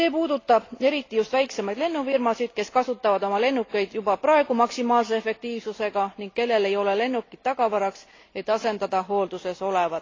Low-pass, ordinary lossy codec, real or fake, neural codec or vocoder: 7.2 kHz; AAC, 32 kbps; real; none